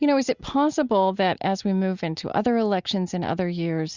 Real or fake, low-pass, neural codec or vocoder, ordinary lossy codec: real; 7.2 kHz; none; Opus, 64 kbps